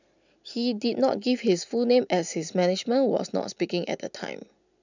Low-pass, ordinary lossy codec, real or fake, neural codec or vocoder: 7.2 kHz; none; real; none